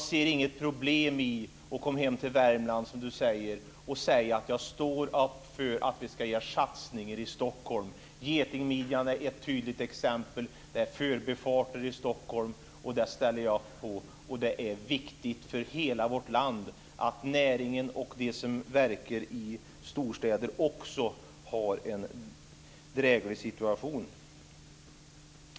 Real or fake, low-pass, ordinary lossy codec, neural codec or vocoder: real; none; none; none